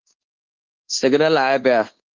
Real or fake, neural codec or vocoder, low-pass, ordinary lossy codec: fake; codec, 24 kHz, 1.2 kbps, DualCodec; 7.2 kHz; Opus, 16 kbps